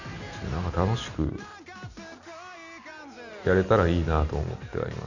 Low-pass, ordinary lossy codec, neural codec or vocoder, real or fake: 7.2 kHz; none; none; real